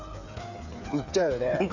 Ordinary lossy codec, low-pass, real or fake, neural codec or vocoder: none; 7.2 kHz; fake; codec, 16 kHz, 8 kbps, FreqCodec, smaller model